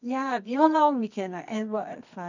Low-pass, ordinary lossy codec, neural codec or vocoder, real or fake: 7.2 kHz; none; codec, 24 kHz, 0.9 kbps, WavTokenizer, medium music audio release; fake